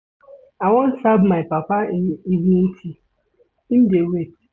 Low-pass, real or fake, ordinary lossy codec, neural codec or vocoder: none; real; none; none